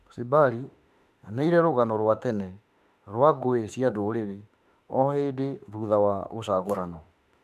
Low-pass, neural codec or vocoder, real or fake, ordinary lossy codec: 14.4 kHz; autoencoder, 48 kHz, 32 numbers a frame, DAC-VAE, trained on Japanese speech; fake; MP3, 96 kbps